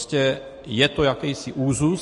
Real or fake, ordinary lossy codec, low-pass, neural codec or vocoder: real; MP3, 48 kbps; 14.4 kHz; none